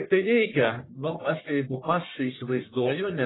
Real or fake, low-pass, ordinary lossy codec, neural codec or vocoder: fake; 7.2 kHz; AAC, 16 kbps; codec, 44.1 kHz, 1.7 kbps, Pupu-Codec